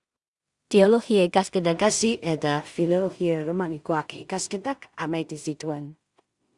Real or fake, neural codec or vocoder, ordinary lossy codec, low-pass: fake; codec, 16 kHz in and 24 kHz out, 0.4 kbps, LongCat-Audio-Codec, two codebook decoder; Opus, 64 kbps; 10.8 kHz